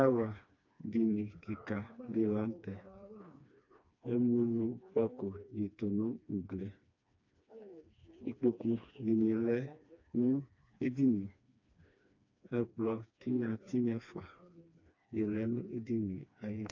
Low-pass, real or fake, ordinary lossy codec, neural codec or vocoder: 7.2 kHz; fake; AAC, 48 kbps; codec, 16 kHz, 2 kbps, FreqCodec, smaller model